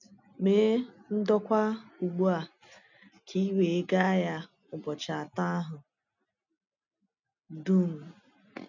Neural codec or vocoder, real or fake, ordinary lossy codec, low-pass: none; real; none; 7.2 kHz